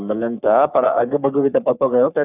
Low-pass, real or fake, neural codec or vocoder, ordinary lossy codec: 3.6 kHz; fake; codec, 44.1 kHz, 3.4 kbps, Pupu-Codec; none